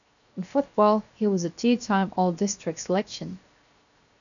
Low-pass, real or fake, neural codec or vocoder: 7.2 kHz; fake; codec, 16 kHz, 0.7 kbps, FocalCodec